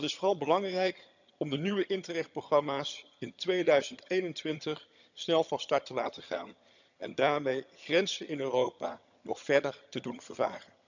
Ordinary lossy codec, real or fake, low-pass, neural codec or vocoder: none; fake; 7.2 kHz; vocoder, 22.05 kHz, 80 mel bands, HiFi-GAN